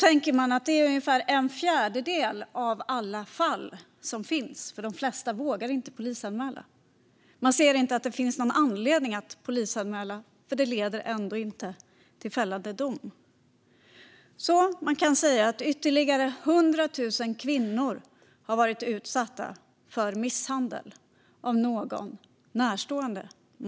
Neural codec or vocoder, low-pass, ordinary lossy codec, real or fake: none; none; none; real